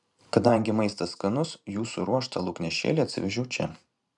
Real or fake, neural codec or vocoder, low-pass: fake; vocoder, 44.1 kHz, 128 mel bands every 512 samples, BigVGAN v2; 10.8 kHz